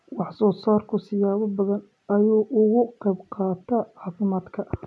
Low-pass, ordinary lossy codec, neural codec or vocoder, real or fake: none; none; none; real